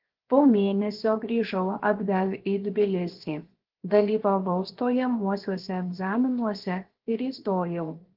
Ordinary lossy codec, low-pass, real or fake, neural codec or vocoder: Opus, 16 kbps; 5.4 kHz; fake; codec, 16 kHz, 0.7 kbps, FocalCodec